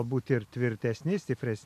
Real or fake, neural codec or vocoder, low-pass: real; none; 14.4 kHz